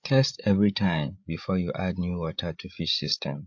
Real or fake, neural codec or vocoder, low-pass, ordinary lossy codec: fake; codec, 16 kHz, 16 kbps, FreqCodec, larger model; 7.2 kHz; AAC, 48 kbps